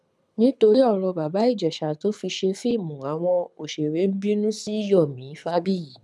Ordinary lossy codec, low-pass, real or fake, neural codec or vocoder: none; none; fake; codec, 24 kHz, 6 kbps, HILCodec